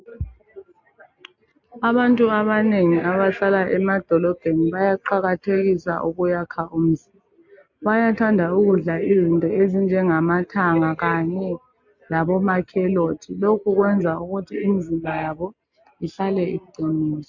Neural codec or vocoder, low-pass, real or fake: none; 7.2 kHz; real